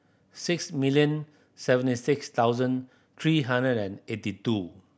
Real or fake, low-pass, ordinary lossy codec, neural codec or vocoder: real; none; none; none